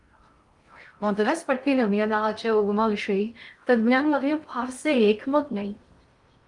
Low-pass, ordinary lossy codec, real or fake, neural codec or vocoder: 10.8 kHz; Opus, 32 kbps; fake; codec, 16 kHz in and 24 kHz out, 0.6 kbps, FocalCodec, streaming, 2048 codes